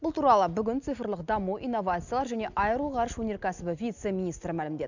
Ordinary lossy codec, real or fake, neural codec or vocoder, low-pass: none; real; none; 7.2 kHz